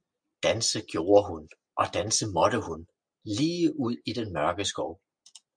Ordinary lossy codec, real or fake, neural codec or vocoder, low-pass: MP3, 64 kbps; real; none; 9.9 kHz